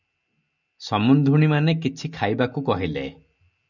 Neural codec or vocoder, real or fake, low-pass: none; real; 7.2 kHz